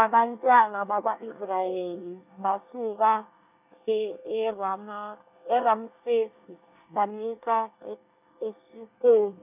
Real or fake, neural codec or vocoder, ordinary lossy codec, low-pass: fake; codec, 24 kHz, 1 kbps, SNAC; none; 3.6 kHz